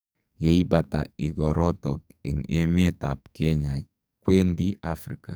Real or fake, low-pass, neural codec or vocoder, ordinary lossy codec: fake; none; codec, 44.1 kHz, 2.6 kbps, SNAC; none